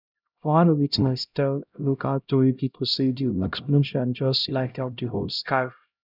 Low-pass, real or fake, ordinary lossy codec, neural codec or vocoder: 5.4 kHz; fake; none; codec, 16 kHz, 0.5 kbps, X-Codec, HuBERT features, trained on LibriSpeech